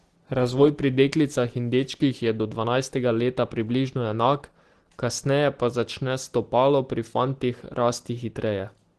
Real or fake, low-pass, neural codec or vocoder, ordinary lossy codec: real; 10.8 kHz; none; Opus, 16 kbps